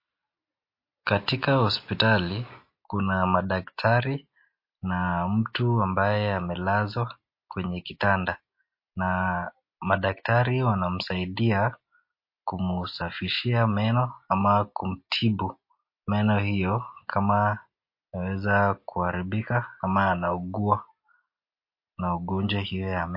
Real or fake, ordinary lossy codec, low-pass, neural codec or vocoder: real; MP3, 32 kbps; 5.4 kHz; none